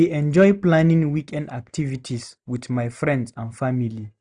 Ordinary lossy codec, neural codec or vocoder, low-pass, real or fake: none; none; 9.9 kHz; real